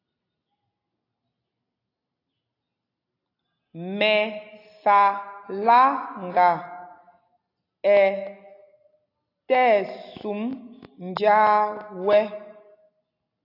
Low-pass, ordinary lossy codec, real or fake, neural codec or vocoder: 5.4 kHz; AAC, 32 kbps; real; none